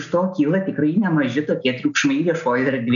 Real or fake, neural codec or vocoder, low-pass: real; none; 7.2 kHz